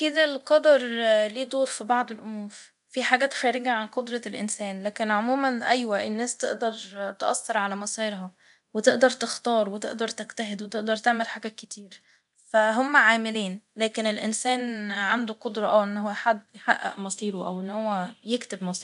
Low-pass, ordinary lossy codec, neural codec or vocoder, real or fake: 10.8 kHz; none; codec, 24 kHz, 0.9 kbps, DualCodec; fake